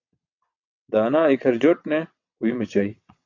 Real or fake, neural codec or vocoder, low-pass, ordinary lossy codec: fake; vocoder, 22.05 kHz, 80 mel bands, WaveNeXt; 7.2 kHz; AAC, 48 kbps